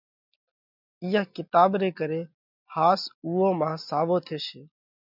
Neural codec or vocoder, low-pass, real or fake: none; 5.4 kHz; real